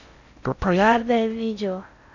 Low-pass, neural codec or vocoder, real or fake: 7.2 kHz; codec, 16 kHz in and 24 kHz out, 0.6 kbps, FocalCodec, streaming, 4096 codes; fake